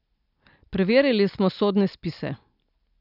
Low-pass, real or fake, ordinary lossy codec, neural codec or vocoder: 5.4 kHz; real; none; none